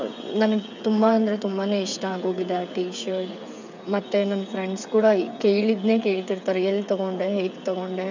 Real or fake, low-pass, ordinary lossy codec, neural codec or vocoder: fake; 7.2 kHz; none; codec, 16 kHz, 8 kbps, FreqCodec, smaller model